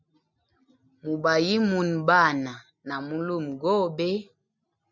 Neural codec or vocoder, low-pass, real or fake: none; 7.2 kHz; real